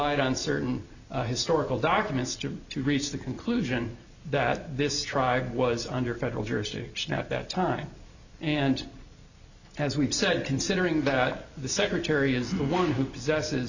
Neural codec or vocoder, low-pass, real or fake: none; 7.2 kHz; real